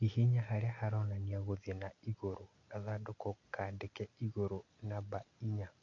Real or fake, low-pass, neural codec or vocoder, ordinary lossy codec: real; 7.2 kHz; none; none